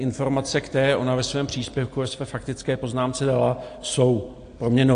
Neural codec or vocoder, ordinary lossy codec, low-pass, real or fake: none; AAC, 48 kbps; 9.9 kHz; real